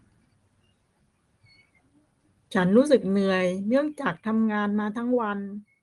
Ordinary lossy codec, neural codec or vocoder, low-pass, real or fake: Opus, 24 kbps; none; 10.8 kHz; real